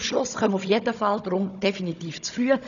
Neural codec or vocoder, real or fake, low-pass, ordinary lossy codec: codec, 16 kHz, 16 kbps, FunCodec, trained on Chinese and English, 50 frames a second; fake; 7.2 kHz; none